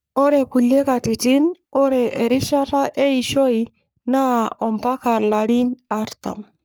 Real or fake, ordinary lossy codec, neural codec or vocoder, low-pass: fake; none; codec, 44.1 kHz, 3.4 kbps, Pupu-Codec; none